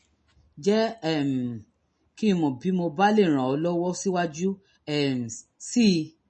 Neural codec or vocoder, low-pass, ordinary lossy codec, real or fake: none; 10.8 kHz; MP3, 32 kbps; real